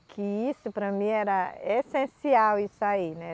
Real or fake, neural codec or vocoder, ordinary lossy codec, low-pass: real; none; none; none